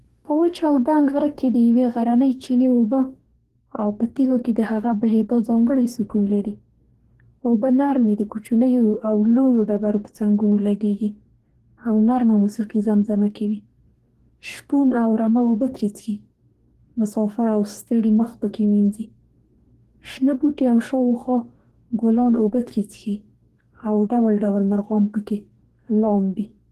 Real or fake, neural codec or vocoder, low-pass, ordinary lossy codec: fake; codec, 44.1 kHz, 2.6 kbps, DAC; 14.4 kHz; Opus, 16 kbps